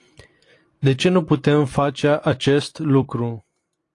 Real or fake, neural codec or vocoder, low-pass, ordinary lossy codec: fake; vocoder, 44.1 kHz, 128 mel bands every 256 samples, BigVGAN v2; 10.8 kHz; AAC, 48 kbps